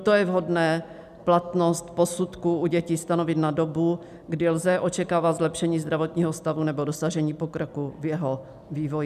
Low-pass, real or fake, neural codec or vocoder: 14.4 kHz; real; none